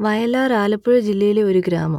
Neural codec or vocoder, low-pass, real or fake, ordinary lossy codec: none; 19.8 kHz; real; none